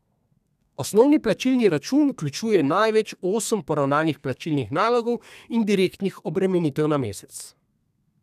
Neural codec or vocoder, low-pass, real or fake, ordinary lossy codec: codec, 32 kHz, 1.9 kbps, SNAC; 14.4 kHz; fake; none